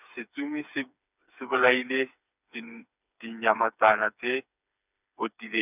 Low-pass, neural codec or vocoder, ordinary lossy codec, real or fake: 3.6 kHz; codec, 16 kHz, 4 kbps, FreqCodec, smaller model; none; fake